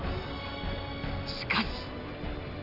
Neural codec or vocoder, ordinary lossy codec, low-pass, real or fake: none; AAC, 48 kbps; 5.4 kHz; real